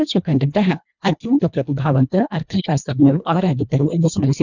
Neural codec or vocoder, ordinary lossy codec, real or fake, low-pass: codec, 24 kHz, 1.5 kbps, HILCodec; none; fake; 7.2 kHz